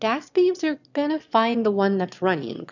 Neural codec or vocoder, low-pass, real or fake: autoencoder, 22.05 kHz, a latent of 192 numbers a frame, VITS, trained on one speaker; 7.2 kHz; fake